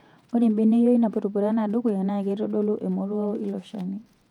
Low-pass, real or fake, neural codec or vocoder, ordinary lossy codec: 19.8 kHz; fake; vocoder, 48 kHz, 128 mel bands, Vocos; none